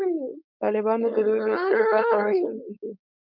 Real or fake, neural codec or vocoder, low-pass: fake; codec, 16 kHz, 4.8 kbps, FACodec; 5.4 kHz